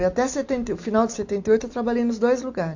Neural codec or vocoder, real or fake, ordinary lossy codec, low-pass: none; real; AAC, 48 kbps; 7.2 kHz